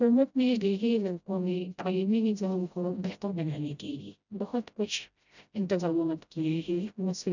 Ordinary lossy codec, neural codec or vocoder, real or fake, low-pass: none; codec, 16 kHz, 0.5 kbps, FreqCodec, smaller model; fake; 7.2 kHz